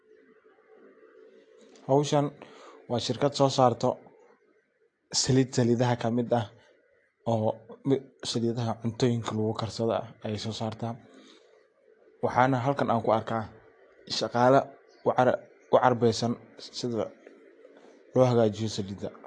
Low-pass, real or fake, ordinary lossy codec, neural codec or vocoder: 9.9 kHz; real; AAC, 48 kbps; none